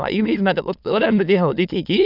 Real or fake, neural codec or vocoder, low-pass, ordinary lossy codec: fake; autoencoder, 22.05 kHz, a latent of 192 numbers a frame, VITS, trained on many speakers; 5.4 kHz; none